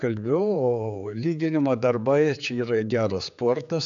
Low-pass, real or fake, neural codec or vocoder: 7.2 kHz; fake; codec, 16 kHz, 4 kbps, X-Codec, HuBERT features, trained on general audio